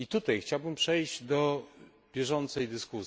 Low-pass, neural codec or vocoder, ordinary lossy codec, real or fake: none; none; none; real